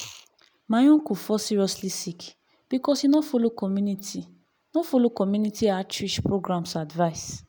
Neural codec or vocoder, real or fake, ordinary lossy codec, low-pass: none; real; none; none